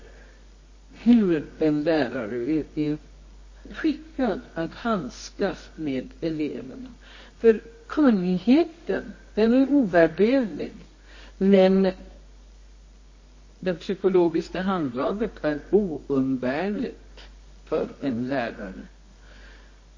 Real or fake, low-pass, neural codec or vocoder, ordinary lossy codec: fake; 7.2 kHz; codec, 24 kHz, 0.9 kbps, WavTokenizer, medium music audio release; MP3, 32 kbps